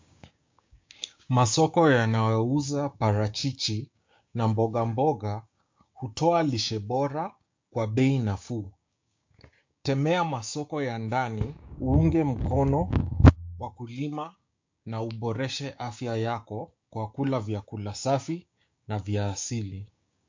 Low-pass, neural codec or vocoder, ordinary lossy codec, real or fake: 7.2 kHz; codec, 16 kHz, 6 kbps, DAC; MP3, 48 kbps; fake